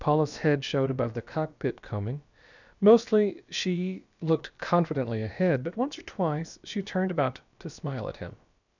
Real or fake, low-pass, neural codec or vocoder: fake; 7.2 kHz; codec, 16 kHz, about 1 kbps, DyCAST, with the encoder's durations